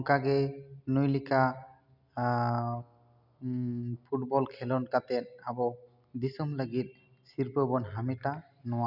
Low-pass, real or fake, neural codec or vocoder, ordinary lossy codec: 5.4 kHz; real; none; none